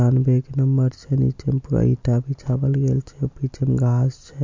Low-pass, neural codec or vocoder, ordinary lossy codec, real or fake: 7.2 kHz; none; MP3, 48 kbps; real